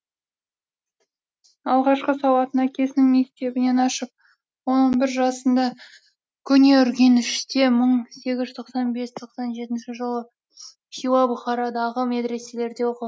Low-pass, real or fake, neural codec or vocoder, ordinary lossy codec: none; real; none; none